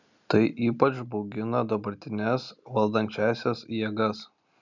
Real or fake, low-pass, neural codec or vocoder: real; 7.2 kHz; none